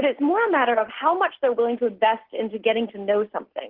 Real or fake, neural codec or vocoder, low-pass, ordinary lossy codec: real; none; 5.4 kHz; Opus, 16 kbps